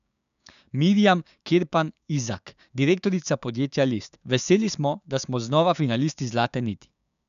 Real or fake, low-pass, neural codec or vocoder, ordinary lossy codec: fake; 7.2 kHz; codec, 16 kHz, 6 kbps, DAC; none